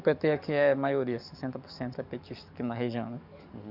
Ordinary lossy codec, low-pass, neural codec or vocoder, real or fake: none; 5.4 kHz; codec, 44.1 kHz, 7.8 kbps, DAC; fake